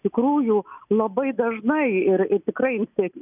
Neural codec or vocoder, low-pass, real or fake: none; 3.6 kHz; real